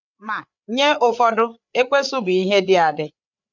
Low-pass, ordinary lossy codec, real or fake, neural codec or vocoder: 7.2 kHz; none; fake; codec, 16 kHz, 8 kbps, FreqCodec, larger model